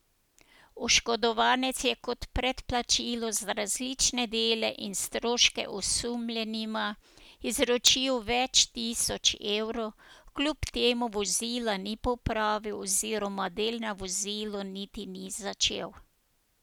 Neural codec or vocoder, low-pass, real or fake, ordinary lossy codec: none; none; real; none